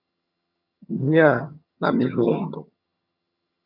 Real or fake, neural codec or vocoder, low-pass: fake; vocoder, 22.05 kHz, 80 mel bands, HiFi-GAN; 5.4 kHz